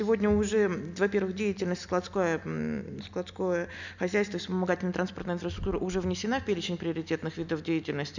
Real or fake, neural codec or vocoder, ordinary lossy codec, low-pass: real; none; none; 7.2 kHz